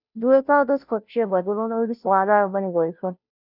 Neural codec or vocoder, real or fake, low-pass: codec, 16 kHz, 0.5 kbps, FunCodec, trained on Chinese and English, 25 frames a second; fake; 5.4 kHz